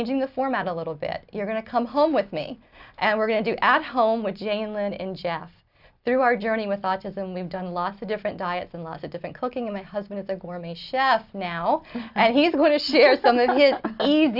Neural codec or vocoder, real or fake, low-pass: none; real; 5.4 kHz